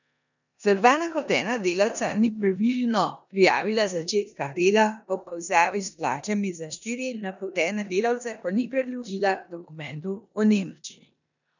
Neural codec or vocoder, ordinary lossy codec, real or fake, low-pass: codec, 16 kHz in and 24 kHz out, 0.9 kbps, LongCat-Audio-Codec, four codebook decoder; none; fake; 7.2 kHz